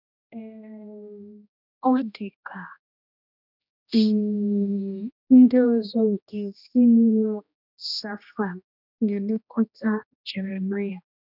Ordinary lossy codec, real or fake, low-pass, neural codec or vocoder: none; fake; 5.4 kHz; codec, 16 kHz, 1 kbps, X-Codec, HuBERT features, trained on general audio